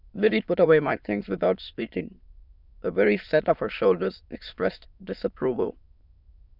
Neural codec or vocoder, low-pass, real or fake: autoencoder, 22.05 kHz, a latent of 192 numbers a frame, VITS, trained on many speakers; 5.4 kHz; fake